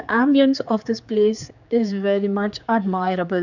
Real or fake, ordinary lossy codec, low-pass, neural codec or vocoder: fake; none; 7.2 kHz; codec, 16 kHz, 4 kbps, X-Codec, HuBERT features, trained on general audio